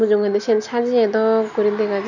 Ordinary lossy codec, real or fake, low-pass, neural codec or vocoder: none; real; 7.2 kHz; none